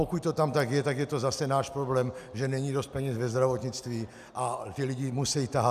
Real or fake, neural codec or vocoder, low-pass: real; none; 14.4 kHz